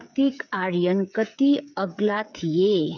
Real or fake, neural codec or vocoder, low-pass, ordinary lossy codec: fake; codec, 16 kHz, 8 kbps, FreqCodec, smaller model; 7.2 kHz; none